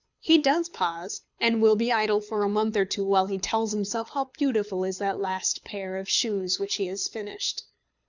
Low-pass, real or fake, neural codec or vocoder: 7.2 kHz; fake; codec, 24 kHz, 6 kbps, HILCodec